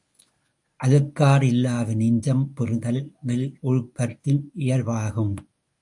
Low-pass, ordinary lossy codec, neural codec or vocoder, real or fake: 10.8 kHz; AAC, 64 kbps; codec, 24 kHz, 0.9 kbps, WavTokenizer, medium speech release version 1; fake